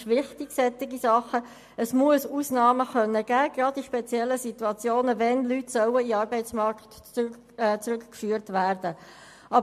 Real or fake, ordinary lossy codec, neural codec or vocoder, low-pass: real; MP3, 64 kbps; none; 14.4 kHz